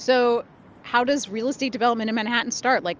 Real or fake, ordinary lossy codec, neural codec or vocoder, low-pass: real; Opus, 24 kbps; none; 7.2 kHz